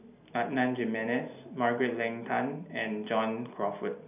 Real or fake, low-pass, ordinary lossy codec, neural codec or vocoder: real; 3.6 kHz; none; none